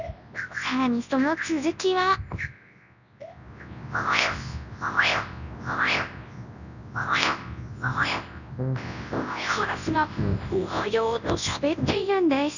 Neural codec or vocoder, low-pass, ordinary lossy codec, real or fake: codec, 24 kHz, 0.9 kbps, WavTokenizer, large speech release; 7.2 kHz; none; fake